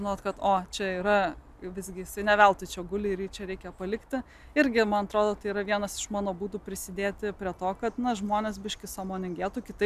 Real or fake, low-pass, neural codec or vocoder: real; 14.4 kHz; none